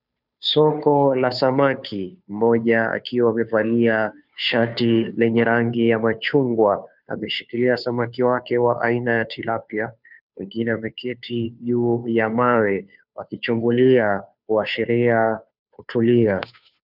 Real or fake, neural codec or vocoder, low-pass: fake; codec, 16 kHz, 2 kbps, FunCodec, trained on Chinese and English, 25 frames a second; 5.4 kHz